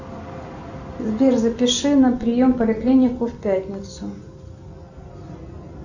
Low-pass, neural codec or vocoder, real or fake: 7.2 kHz; none; real